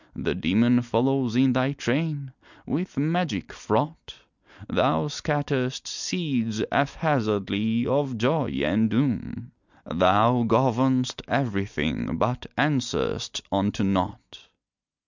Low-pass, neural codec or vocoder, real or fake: 7.2 kHz; none; real